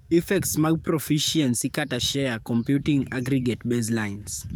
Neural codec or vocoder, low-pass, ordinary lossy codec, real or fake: codec, 44.1 kHz, 7.8 kbps, DAC; none; none; fake